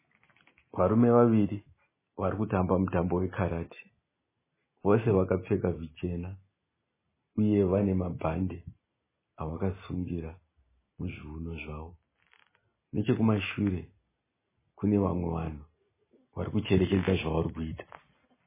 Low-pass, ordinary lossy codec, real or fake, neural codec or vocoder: 3.6 kHz; MP3, 16 kbps; real; none